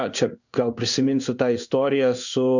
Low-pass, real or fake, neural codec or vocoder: 7.2 kHz; fake; codec, 16 kHz in and 24 kHz out, 1 kbps, XY-Tokenizer